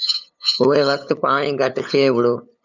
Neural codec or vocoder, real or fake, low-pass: codec, 16 kHz, 8 kbps, FunCodec, trained on LibriTTS, 25 frames a second; fake; 7.2 kHz